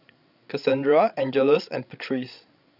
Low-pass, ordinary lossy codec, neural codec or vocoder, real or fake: 5.4 kHz; none; codec, 16 kHz, 16 kbps, FreqCodec, larger model; fake